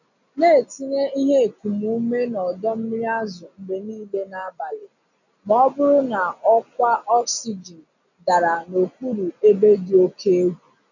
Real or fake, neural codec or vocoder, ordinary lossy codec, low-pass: real; none; none; 7.2 kHz